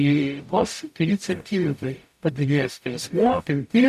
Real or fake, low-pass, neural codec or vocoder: fake; 14.4 kHz; codec, 44.1 kHz, 0.9 kbps, DAC